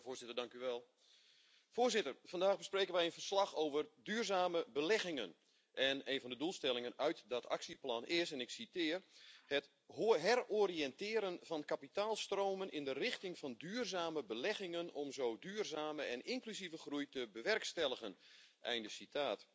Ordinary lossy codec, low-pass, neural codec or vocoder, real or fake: none; none; none; real